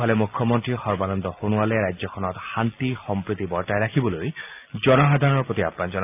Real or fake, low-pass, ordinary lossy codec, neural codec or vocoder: real; 3.6 kHz; AAC, 32 kbps; none